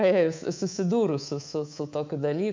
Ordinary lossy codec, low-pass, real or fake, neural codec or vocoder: MP3, 64 kbps; 7.2 kHz; fake; codec, 24 kHz, 3.1 kbps, DualCodec